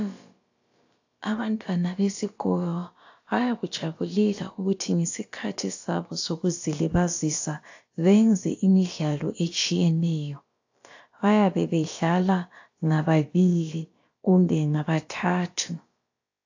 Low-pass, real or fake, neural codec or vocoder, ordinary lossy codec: 7.2 kHz; fake; codec, 16 kHz, about 1 kbps, DyCAST, with the encoder's durations; AAC, 48 kbps